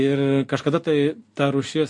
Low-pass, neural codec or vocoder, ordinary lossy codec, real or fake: 10.8 kHz; none; MP3, 48 kbps; real